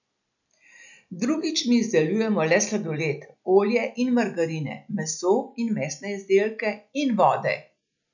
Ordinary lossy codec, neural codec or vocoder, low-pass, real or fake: none; none; 7.2 kHz; real